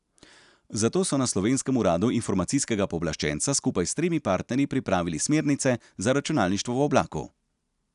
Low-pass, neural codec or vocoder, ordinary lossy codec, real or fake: 10.8 kHz; none; none; real